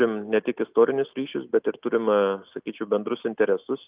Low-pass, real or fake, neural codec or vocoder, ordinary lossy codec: 3.6 kHz; real; none; Opus, 24 kbps